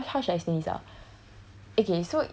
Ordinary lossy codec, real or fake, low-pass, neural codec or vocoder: none; real; none; none